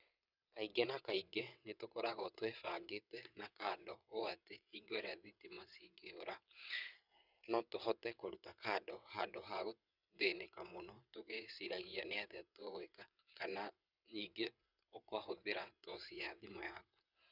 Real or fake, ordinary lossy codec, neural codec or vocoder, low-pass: fake; none; vocoder, 22.05 kHz, 80 mel bands, WaveNeXt; 5.4 kHz